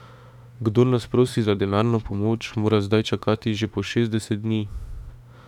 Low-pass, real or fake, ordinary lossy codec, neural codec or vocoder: 19.8 kHz; fake; none; autoencoder, 48 kHz, 32 numbers a frame, DAC-VAE, trained on Japanese speech